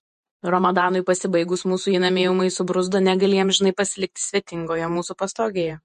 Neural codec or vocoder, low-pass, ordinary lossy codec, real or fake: vocoder, 48 kHz, 128 mel bands, Vocos; 14.4 kHz; MP3, 48 kbps; fake